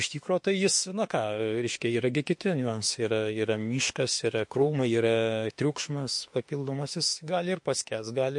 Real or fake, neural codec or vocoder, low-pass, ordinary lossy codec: fake; autoencoder, 48 kHz, 32 numbers a frame, DAC-VAE, trained on Japanese speech; 10.8 kHz; MP3, 48 kbps